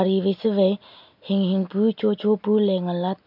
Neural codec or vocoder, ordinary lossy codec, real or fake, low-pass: none; MP3, 48 kbps; real; 5.4 kHz